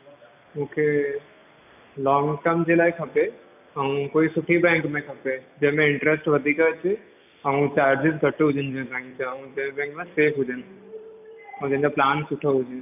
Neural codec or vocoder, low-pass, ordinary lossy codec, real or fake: none; 3.6 kHz; none; real